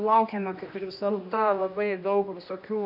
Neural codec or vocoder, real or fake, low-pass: codec, 16 kHz, 1 kbps, X-Codec, HuBERT features, trained on general audio; fake; 5.4 kHz